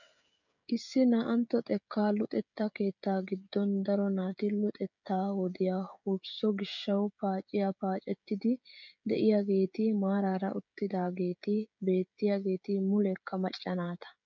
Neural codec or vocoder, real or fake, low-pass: codec, 16 kHz, 16 kbps, FreqCodec, smaller model; fake; 7.2 kHz